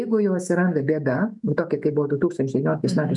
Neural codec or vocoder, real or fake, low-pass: autoencoder, 48 kHz, 128 numbers a frame, DAC-VAE, trained on Japanese speech; fake; 10.8 kHz